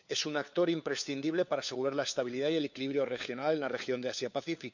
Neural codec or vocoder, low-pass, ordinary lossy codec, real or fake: codec, 16 kHz, 4 kbps, FunCodec, trained on LibriTTS, 50 frames a second; 7.2 kHz; none; fake